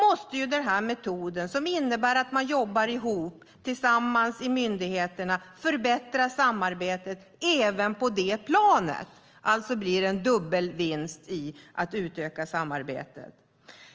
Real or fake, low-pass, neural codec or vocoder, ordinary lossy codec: real; 7.2 kHz; none; Opus, 24 kbps